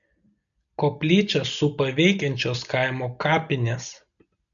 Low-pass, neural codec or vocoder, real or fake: 7.2 kHz; none; real